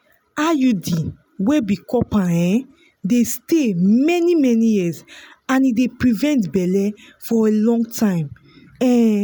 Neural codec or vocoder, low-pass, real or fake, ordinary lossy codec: none; none; real; none